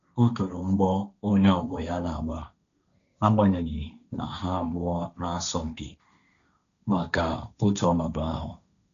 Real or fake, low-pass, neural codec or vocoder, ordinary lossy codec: fake; 7.2 kHz; codec, 16 kHz, 1.1 kbps, Voila-Tokenizer; none